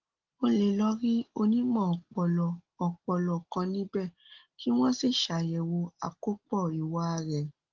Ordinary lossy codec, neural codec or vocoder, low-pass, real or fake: Opus, 16 kbps; none; 7.2 kHz; real